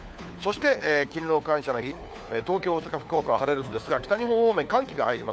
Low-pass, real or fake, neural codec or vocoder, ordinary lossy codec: none; fake; codec, 16 kHz, 4 kbps, FunCodec, trained on LibriTTS, 50 frames a second; none